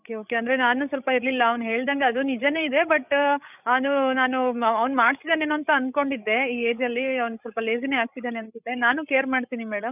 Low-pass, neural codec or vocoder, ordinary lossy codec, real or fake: 3.6 kHz; codec, 16 kHz, 16 kbps, FreqCodec, larger model; none; fake